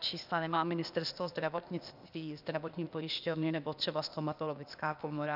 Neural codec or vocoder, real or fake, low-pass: codec, 16 kHz, 0.8 kbps, ZipCodec; fake; 5.4 kHz